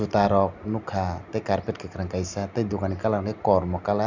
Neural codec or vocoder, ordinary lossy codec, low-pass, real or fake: none; none; 7.2 kHz; real